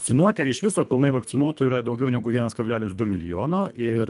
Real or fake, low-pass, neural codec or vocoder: fake; 10.8 kHz; codec, 24 kHz, 1.5 kbps, HILCodec